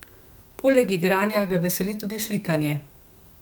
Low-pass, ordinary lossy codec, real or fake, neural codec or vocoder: none; none; fake; codec, 44.1 kHz, 2.6 kbps, SNAC